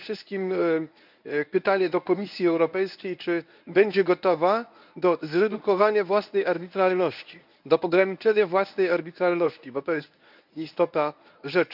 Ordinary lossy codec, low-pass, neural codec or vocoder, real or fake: none; 5.4 kHz; codec, 24 kHz, 0.9 kbps, WavTokenizer, medium speech release version 1; fake